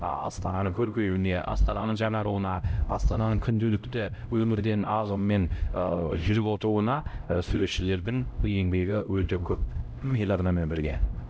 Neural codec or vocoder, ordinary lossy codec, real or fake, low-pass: codec, 16 kHz, 0.5 kbps, X-Codec, HuBERT features, trained on LibriSpeech; none; fake; none